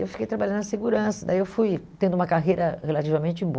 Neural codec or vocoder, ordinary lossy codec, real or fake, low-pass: none; none; real; none